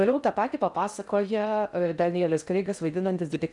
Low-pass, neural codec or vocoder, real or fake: 10.8 kHz; codec, 16 kHz in and 24 kHz out, 0.6 kbps, FocalCodec, streaming, 4096 codes; fake